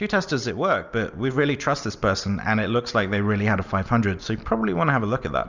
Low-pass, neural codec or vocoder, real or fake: 7.2 kHz; none; real